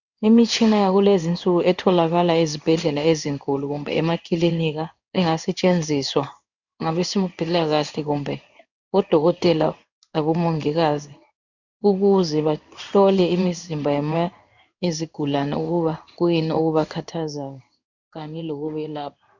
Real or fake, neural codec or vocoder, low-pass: fake; codec, 16 kHz in and 24 kHz out, 1 kbps, XY-Tokenizer; 7.2 kHz